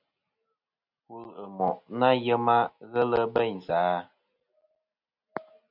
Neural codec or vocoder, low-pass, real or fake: none; 5.4 kHz; real